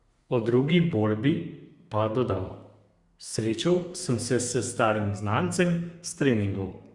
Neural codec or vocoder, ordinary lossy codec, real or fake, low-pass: codec, 32 kHz, 1.9 kbps, SNAC; Opus, 64 kbps; fake; 10.8 kHz